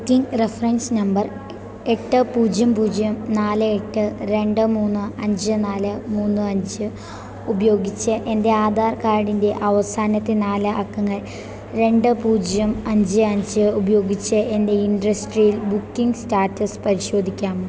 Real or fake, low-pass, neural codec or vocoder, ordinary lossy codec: real; none; none; none